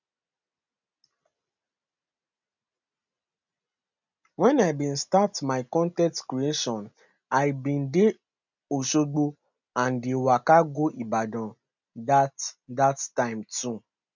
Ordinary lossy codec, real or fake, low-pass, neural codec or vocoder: none; real; 7.2 kHz; none